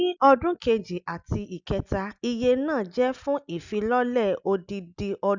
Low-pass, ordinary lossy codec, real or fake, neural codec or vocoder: 7.2 kHz; none; real; none